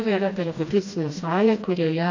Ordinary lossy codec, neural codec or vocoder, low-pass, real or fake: AAC, 48 kbps; codec, 16 kHz, 1 kbps, FreqCodec, smaller model; 7.2 kHz; fake